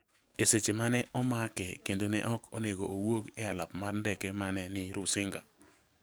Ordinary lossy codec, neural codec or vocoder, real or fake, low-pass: none; codec, 44.1 kHz, 7.8 kbps, DAC; fake; none